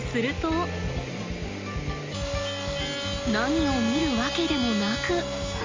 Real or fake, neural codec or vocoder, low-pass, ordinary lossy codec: real; none; 7.2 kHz; Opus, 32 kbps